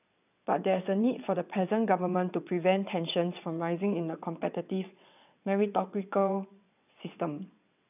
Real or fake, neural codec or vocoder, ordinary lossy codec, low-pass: fake; vocoder, 22.05 kHz, 80 mel bands, WaveNeXt; none; 3.6 kHz